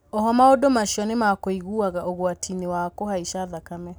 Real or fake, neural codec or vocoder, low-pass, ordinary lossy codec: real; none; none; none